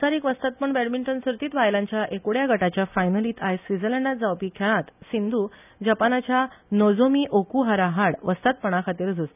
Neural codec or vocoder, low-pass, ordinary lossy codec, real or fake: none; 3.6 kHz; none; real